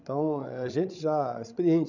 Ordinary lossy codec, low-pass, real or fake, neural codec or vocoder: none; 7.2 kHz; fake; codec, 16 kHz, 16 kbps, FreqCodec, larger model